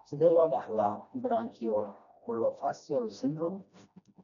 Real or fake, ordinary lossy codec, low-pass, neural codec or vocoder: fake; none; 7.2 kHz; codec, 16 kHz, 1 kbps, FreqCodec, smaller model